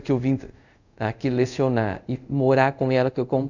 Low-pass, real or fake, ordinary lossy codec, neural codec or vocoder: 7.2 kHz; fake; none; codec, 24 kHz, 0.5 kbps, DualCodec